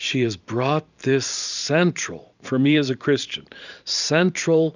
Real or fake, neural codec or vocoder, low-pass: fake; vocoder, 44.1 kHz, 128 mel bands every 512 samples, BigVGAN v2; 7.2 kHz